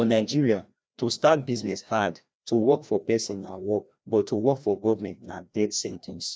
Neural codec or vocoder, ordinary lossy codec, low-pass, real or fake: codec, 16 kHz, 1 kbps, FreqCodec, larger model; none; none; fake